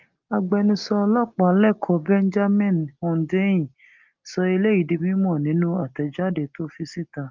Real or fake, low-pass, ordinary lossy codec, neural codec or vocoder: real; 7.2 kHz; Opus, 24 kbps; none